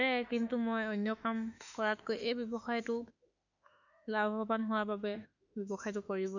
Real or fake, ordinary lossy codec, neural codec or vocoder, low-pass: fake; none; autoencoder, 48 kHz, 32 numbers a frame, DAC-VAE, trained on Japanese speech; 7.2 kHz